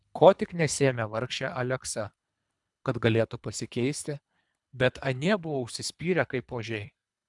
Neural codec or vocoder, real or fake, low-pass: codec, 24 kHz, 3 kbps, HILCodec; fake; 10.8 kHz